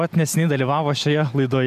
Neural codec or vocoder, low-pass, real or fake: none; 14.4 kHz; real